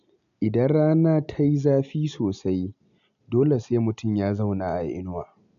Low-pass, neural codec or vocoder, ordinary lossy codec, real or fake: 7.2 kHz; none; none; real